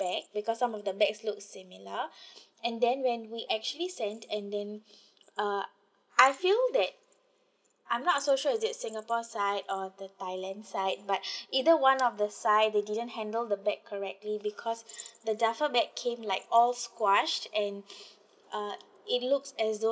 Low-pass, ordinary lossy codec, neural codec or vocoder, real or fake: none; none; none; real